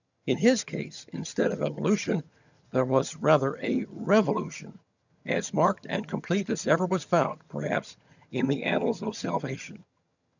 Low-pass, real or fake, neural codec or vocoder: 7.2 kHz; fake; vocoder, 22.05 kHz, 80 mel bands, HiFi-GAN